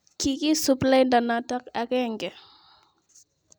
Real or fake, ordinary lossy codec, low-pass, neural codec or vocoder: real; none; none; none